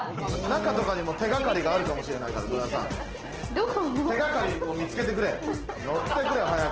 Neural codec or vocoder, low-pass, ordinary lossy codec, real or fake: none; 7.2 kHz; Opus, 16 kbps; real